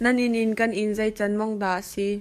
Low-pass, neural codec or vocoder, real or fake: 14.4 kHz; codec, 44.1 kHz, 7.8 kbps, DAC; fake